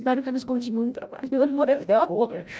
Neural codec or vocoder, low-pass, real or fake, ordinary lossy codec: codec, 16 kHz, 0.5 kbps, FreqCodec, larger model; none; fake; none